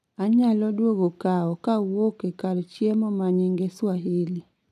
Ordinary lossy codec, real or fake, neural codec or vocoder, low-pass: none; real; none; 14.4 kHz